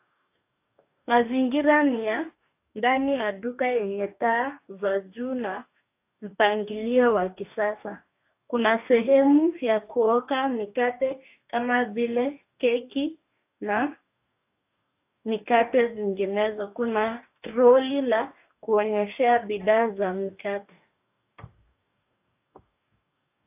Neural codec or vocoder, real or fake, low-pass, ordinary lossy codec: codec, 44.1 kHz, 2.6 kbps, DAC; fake; 3.6 kHz; AAC, 32 kbps